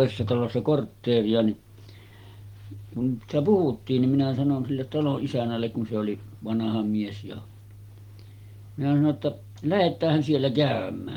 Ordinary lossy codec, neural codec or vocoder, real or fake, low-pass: Opus, 24 kbps; none; real; 19.8 kHz